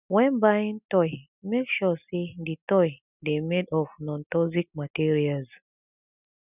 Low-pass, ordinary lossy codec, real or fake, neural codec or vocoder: 3.6 kHz; none; real; none